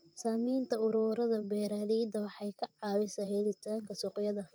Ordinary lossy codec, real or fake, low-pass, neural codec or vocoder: none; fake; none; vocoder, 44.1 kHz, 128 mel bands, Pupu-Vocoder